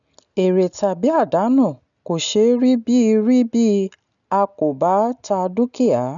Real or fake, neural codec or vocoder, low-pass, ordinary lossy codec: real; none; 7.2 kHz; none